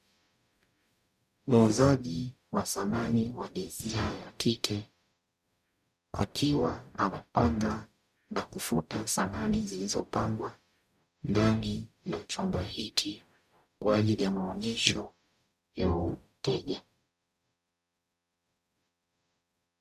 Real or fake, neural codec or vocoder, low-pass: fake; codec, 44.1 kHz, 0.9 kbps, DAC; 14.4 kHz